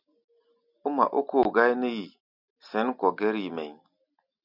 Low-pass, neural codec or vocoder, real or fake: 5.4 kHz; none; real